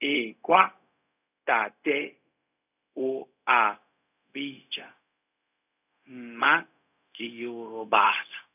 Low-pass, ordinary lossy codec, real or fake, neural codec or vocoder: 3.6 kHz; none; fake; codec, 16 kHz, 0.4 kbps, LongCat-Audio-Codec